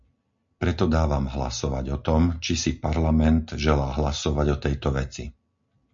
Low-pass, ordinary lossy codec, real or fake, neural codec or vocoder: 7.2 kHz; MP3, 48 kbps; real; none